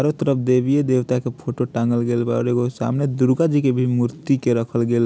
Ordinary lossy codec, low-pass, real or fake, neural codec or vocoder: none; none; real; none